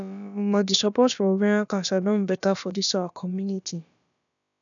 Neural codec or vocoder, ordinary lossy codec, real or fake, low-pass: codec, 16 kHz, about 1 kbps, DyCAST, with the encoder's durations; none; fake; 7.2 kHz